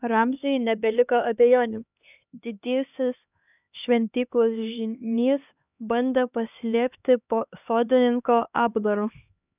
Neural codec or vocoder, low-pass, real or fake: codec, 16 kHz, 2 kbps, X-Codec, HuBERT features, trained on LibriSpeech; 3.6 kHz; fake